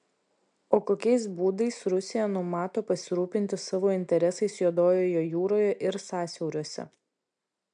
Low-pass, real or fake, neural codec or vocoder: 9.9 kHz; real; none